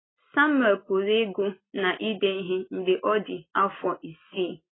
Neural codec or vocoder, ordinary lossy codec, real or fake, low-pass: none; AAC, 16 kbps; real; 7.2 kHz